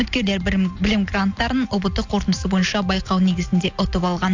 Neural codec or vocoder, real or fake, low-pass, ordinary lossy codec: none; real; 7.2 kHz; AAC, 48 kbps